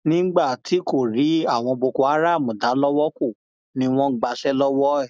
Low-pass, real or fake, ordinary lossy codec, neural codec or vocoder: none; real; none; none